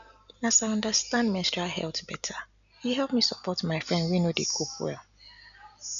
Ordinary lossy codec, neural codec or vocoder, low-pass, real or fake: none; none; 7.2 kHz; real